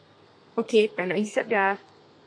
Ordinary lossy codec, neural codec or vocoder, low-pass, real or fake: AAC, 48 kbps; codec, 32 kHz, 1.9 kbps, SNAC; 9.9 kHz; fake